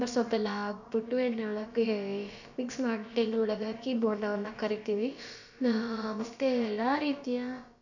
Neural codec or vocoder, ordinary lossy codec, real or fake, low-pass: codec, 16 kHz, about 1 kbps, DyCAST, with the encoder's durations; none; fake; 7.2 kHz